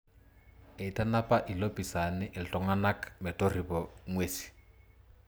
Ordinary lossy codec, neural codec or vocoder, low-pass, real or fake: none; none; none; real